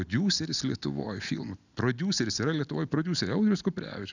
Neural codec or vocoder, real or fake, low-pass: none; real; 7.2 kHz